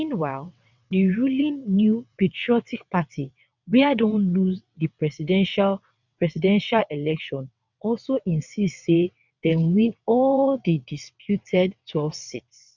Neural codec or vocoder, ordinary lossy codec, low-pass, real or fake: vocoder, 22.05 kHz, 80 mel bands, WaveNeXt; none; 7.2 kHz; fake